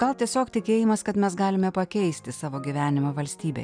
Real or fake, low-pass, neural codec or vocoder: real; 9.9 kHz; none